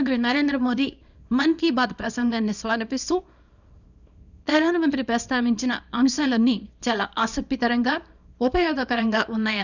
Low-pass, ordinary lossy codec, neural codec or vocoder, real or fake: 7.2 kHz; none; codec, 24 kHz, 0.9 kbps, WavTokenizer, small release; fake